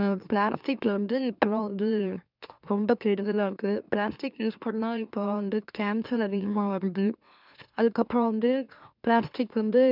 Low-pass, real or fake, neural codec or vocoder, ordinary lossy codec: 5.4 kHz; fake; autoencoder, 44.1 kHz, a latent of 192 numbers a frame, MeloTTS; none